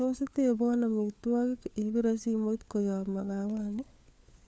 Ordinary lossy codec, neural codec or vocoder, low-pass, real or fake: none; codec, 16 kHz, 4 kbps, FunCodec, trained on LibriTTS, 50 frames a second; none; fake